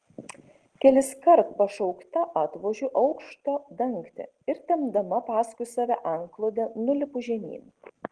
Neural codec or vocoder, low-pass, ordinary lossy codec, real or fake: none; 9.9 kHz; Opus, 16 kbps; real